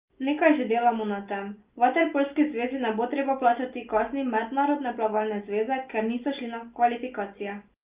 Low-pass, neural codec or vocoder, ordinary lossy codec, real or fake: 3.6 kHz; none; Opus, 24 kbps; real